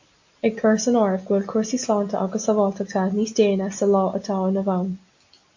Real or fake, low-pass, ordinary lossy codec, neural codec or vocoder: real; 7.2 kHz; AAC, 48 kbps; none